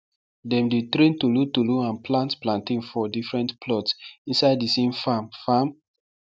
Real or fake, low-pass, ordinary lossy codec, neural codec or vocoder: real; none; none; none